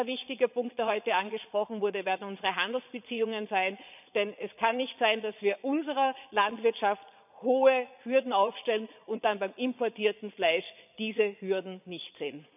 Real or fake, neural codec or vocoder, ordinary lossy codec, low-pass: fake; vocoder, 22.05 kHz, 80 mel bands, Vocos; none; 3.6 kHz